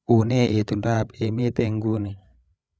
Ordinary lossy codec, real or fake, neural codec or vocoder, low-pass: none; fake; codec, 16 kHz, 8 kbps, FreqCodec, larger model; none